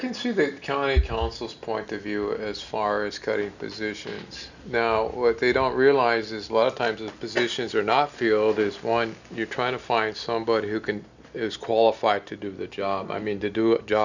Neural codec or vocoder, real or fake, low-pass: none; real; 7.2 kHz